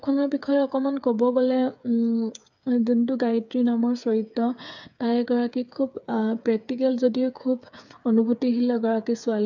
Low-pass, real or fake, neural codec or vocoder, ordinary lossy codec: 7.2 kHz; fake; codec, 16 kHz, 8 kbps, FreqCodec, smaller model; none